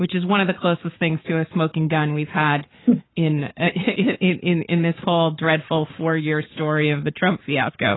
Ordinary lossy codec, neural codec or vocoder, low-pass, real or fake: AAC, 16 kbps; codec, 44.1 kHz, 7.8 kbps, Pupu-Codec; 7.2 kHz; fake